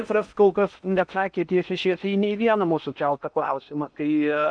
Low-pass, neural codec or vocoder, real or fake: 9.9 kHz; codec, 16 kHz in and 24 kHz out, 0.8 kbps, FocalCodec, streaming, 65536 codes; fake